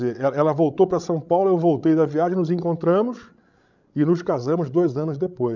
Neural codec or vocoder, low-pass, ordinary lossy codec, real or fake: codec, 16 kHz, 16 kbps, FreqCodec, larger model; 7.2 kHz; none; fake